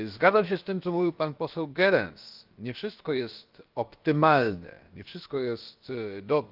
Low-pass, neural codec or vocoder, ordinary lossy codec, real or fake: 5.4 kHz; codec, 16 kHz, about 1 kbps, DyCAST, with the encoder's durations; Opus, 24 kbps; fake